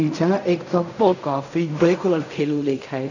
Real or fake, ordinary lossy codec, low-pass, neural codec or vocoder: fake; none; 7.2 kHz; codec, 16 kHz in and 24 kHz out, 0.4 kbps, LongCat-Audio-Codec, fine tuned four codebook decoder